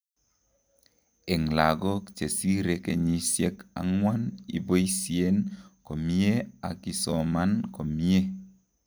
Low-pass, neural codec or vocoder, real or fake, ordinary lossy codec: none; none; real; none